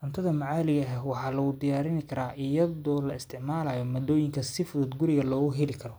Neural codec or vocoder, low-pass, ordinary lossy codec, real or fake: none; none; none; real